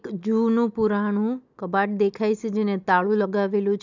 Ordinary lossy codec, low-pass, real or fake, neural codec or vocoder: none; 7.2 kHz; real; none